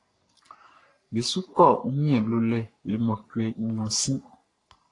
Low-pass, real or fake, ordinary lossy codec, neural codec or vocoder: 10.8 kHz; fake; AAC, 48 kbps; codec, 44.1 kHz, 3.4 kbps, Pupu-Codec